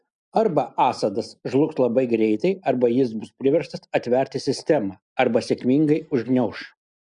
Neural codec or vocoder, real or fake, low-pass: none; real; 9.9 kHz